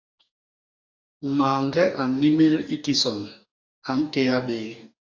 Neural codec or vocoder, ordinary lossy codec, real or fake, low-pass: codec, 44.1 kHz, 2.6 kbps, DAC; AAC, 48 kbps; fake; 7.2 kHz